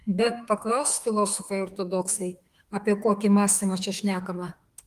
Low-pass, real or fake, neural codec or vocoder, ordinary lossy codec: 14.4 kHz; fake; autoencoder, 48 kHz, 32 numbers a frame, DAC-VAE, trained on Japanese speech; Opus, 24 kbps